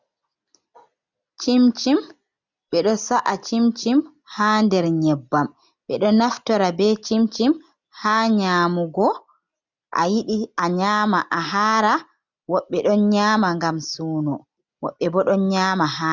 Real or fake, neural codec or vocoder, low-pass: real; none; 7.2 kHz